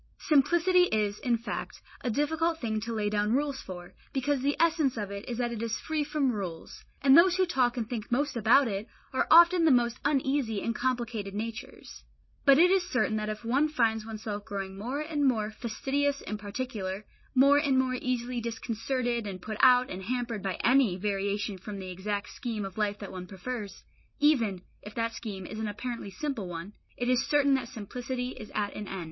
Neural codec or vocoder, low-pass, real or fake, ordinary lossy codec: none; 7.2 kHz; real; MP3, 24 kbps